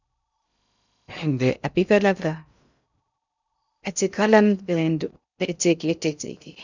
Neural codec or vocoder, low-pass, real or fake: codec, 16 kHz in and 24 kHz out, 0.6 kbps, FocalCodec, streaming, 2048 codes; 7.2 kHz; fake